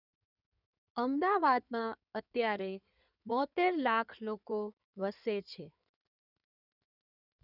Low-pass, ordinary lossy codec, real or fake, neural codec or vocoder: 5.4 kHz; none; fake; codec, 16 kHz in and 24 kHz out, 2.2 kbps, FireRedTTS-2 codec